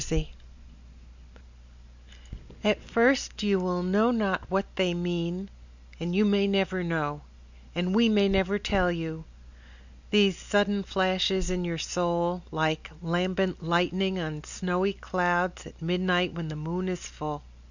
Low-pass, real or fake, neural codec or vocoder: 7.2 kHz; real; none